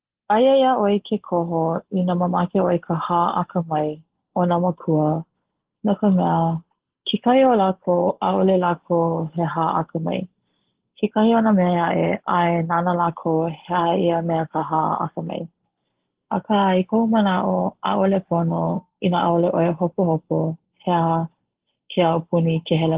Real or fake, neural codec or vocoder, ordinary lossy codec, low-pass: real; none; Opus, 16 kbps; 3.6 kHz